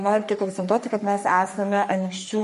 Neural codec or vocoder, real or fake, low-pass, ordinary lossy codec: codec, 44.1 kHz, 3.4 kbps, Pupu-Codec; fake; 14.4 kHz; MP3, 48 kbps